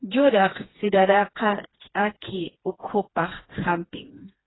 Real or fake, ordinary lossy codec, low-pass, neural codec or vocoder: fake; AAC, 16 kbps; 7.2 kHz; codec, 16 kHz, 2 kbps, FreqCodec, smaller model